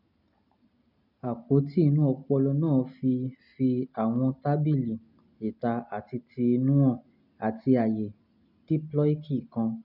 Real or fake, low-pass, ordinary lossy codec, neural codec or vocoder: real; 5.4 kHz; none; none